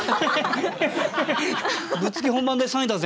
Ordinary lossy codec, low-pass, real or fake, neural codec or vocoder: none; none; real; none